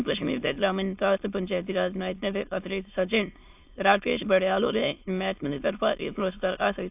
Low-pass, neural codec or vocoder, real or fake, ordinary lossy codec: 3.6 kHz; autoencoder, 22.05 kHz, a latent of 192 numbers a frame, VITS, trained on many speakers; fake; AAC, 32 kbps